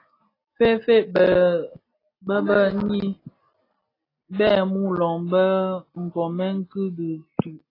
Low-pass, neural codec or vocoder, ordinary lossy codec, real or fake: 5.4 kHz; none; AAC, 24 kbps; real